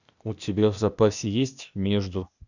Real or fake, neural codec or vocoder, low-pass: fake; codec, 16 kHz, 0.8 kbps, ZipCodec; 7.2 kHz